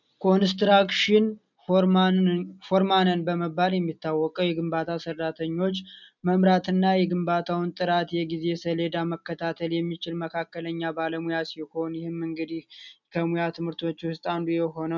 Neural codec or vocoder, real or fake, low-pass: none; real; 7.2 kHz